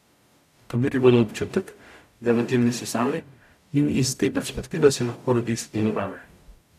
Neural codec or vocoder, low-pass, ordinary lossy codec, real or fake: codec, 44.1 kHz, 0.9 kbps, DAC; 14.4 kHz; none; fake